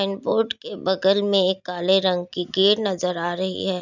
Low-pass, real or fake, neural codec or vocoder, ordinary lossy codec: 7.2 kHz; real; none; none